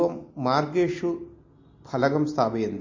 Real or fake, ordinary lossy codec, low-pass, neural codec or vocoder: real; MP3, 32 kbps; 7.2 kHz; none